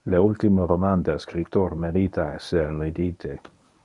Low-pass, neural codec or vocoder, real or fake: 10.8 kHz; codec, 24 kHz, 0.9 kbps, WavTokenizer, medium speech release version 1; fake